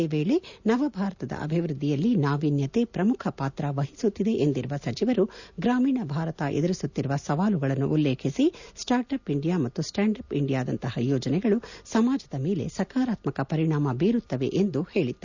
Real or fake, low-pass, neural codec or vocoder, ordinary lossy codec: real; 7.2 kHz; none; none